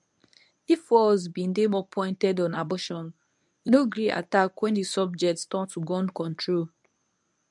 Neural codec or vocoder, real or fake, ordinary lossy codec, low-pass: codec, 24 kHz, 0.9 kbps, WavTokenizer, medium speech release version 1; fake; none; 10.8 kHz